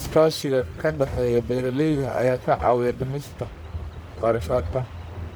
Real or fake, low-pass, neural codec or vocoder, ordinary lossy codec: fake; none; codec, 44.1 kHz, 1.7 kbps, Pupu-Codec; none